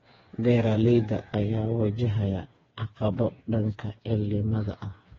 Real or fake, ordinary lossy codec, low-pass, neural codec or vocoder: fake; AAC, 24 kbps; 7.2 kHz; codec, 16 kHz, 4 kbps, FreqCodec, smaller model